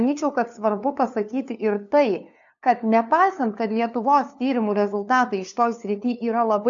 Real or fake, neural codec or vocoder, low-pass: fake; codec, 16 kHz, 2 kbps, FunCodec, trained on LibriTTS, 25 frames a second; 7.2 kHz